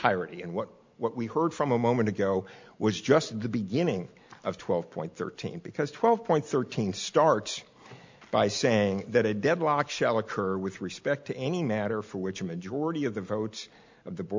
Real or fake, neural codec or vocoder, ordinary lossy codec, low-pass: real; none; MP3, 64 kbps; 7.2 kHz